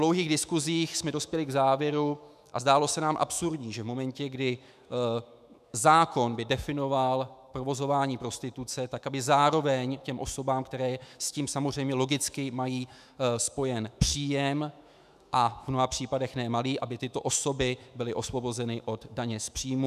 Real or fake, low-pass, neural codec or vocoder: fake; 14.4 kHz; autoencoder, 48 kHz, 128 numbers a frame, DAC-VAE, trained on Japanese speech